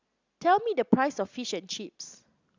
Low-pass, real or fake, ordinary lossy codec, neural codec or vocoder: 7.2 kHz; real; Opus, 64 kbps; none